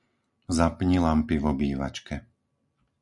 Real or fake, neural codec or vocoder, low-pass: real; none; 10.8 kHz